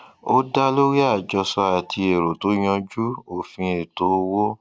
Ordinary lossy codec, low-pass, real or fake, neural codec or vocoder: none; none; real; none